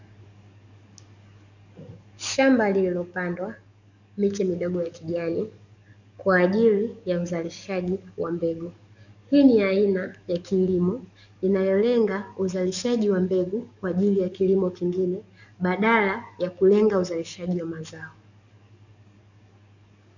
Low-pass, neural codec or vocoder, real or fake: 7.2 kHz; codec, 44.1 kHz, 7.8 kbps, DAC; fake